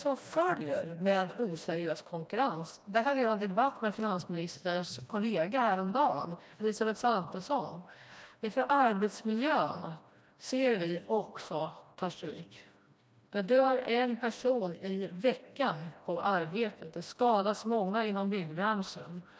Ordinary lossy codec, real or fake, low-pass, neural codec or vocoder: none; fake; none; codec, 16 kHz, 1 kbps, FreqCodec, smaller model